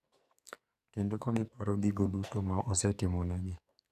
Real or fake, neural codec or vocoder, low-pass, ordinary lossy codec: fake; codec, 44.1 kHz, 2.6 kbps, SNAC; 14.4 kHz; none